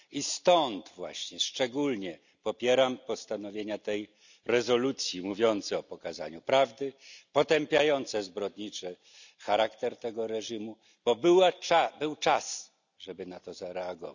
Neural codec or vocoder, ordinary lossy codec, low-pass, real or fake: none; none; 7.2 kHz; real